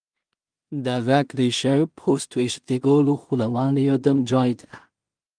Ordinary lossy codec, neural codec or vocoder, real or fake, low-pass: Opus, 32 kbps; codec, 16 kHz in and 24 kHz out, 0.4 kbps, LongCat-Audio-Codec, two codebook decoder; fake; 9.9 kHz